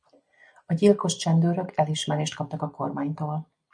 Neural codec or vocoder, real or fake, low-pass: none; real; 9.9 kHz